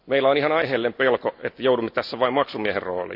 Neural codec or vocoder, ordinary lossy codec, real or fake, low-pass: none; AAC, 48 kbps; real; 5.4 kHz